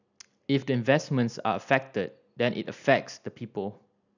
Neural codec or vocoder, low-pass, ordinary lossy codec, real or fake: none; 7.2 kHz; none; real